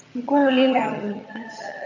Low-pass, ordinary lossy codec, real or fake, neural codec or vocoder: 7.2 kHz; AAC, 48 kbps; fake; vocoder, 22.05 kHz, 80 mel bands, HiFi-GAN